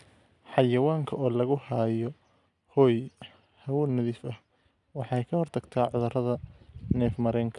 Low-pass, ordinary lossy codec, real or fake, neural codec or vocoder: 10.8 kHz; none; real; none